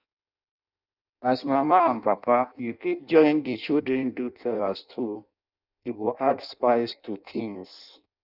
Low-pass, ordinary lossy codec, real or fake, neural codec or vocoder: 5.4 kHz; none; fake; codec, 16 kHz in and 24 kHz out, 0.6 kbps, FireRedTTS-2 codec